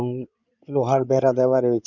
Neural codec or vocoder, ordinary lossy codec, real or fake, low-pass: codec, 16 kHz in and 24 kHz out, 2.2 kbps, FireRedTTS-2 codec; none; fake; 7.2 kHz